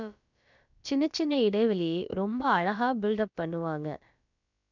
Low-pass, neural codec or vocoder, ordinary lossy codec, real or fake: 7.2 kHz; codec, 16 kHz, about 1 kbps, DyCAST, with the encoder's durations; none; fake